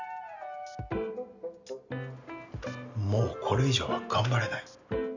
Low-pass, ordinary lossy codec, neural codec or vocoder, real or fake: 7.2 kHz; none; none; real